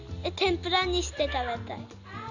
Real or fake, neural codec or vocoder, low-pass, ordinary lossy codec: real; none; 7.2 kHz; none